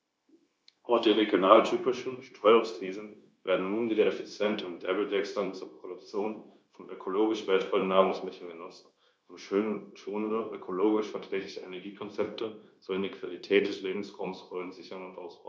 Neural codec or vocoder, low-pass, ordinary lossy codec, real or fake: codec, 16 kHz, 0.9 kbps, LongCat-Audio-Codec; none; none; fake